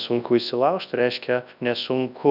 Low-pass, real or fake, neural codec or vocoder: 5.4 kHz; fake; codec, 24 kHz, 0.9 kbps, WavTokenizer, large speech release